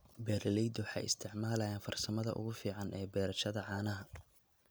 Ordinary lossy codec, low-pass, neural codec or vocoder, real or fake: none; none; none; real